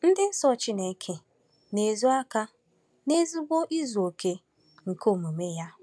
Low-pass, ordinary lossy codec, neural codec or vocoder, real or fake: none; none; none; real